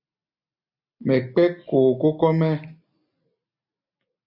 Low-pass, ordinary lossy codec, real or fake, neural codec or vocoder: 5.4 kHz; MP3, 32 kbps; real; none